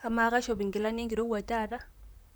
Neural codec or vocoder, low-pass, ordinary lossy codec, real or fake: vocoder, 44.1 kHz, 128 mel bands, Pupu-Vocoder; none; none; fake